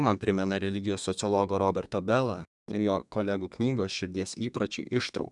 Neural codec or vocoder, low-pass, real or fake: codec, 32 kHz, 1.9 kbps, SNAC; 10.8 kHz; fake